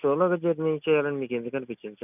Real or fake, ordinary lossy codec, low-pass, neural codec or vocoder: real; none; 3.6 kHz; none